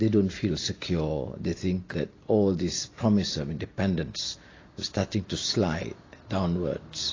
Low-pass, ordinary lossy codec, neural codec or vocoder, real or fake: 7.2 kHz; AAC, 32 kbps; none; real